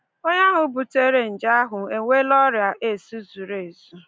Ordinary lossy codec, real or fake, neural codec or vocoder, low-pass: none; real; none; none